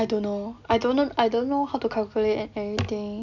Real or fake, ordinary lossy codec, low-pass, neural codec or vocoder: real; none; 7.2 kHz; none